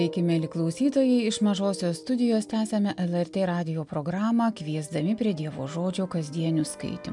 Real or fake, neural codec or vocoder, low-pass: real; none; 10.8 kHz